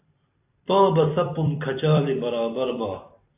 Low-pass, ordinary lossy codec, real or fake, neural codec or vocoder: 3.6 kHz; AAC, 24 kbps; fake; vocoder, 44.1 kHz, 128 mel bands every 256 samples, BigVGAN v2